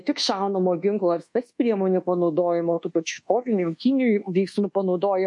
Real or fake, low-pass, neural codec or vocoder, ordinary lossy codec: fake; 10.8 kHz; codec, 24 kHz, 1.2 kbps, DualCodec; MP3, 48 kbps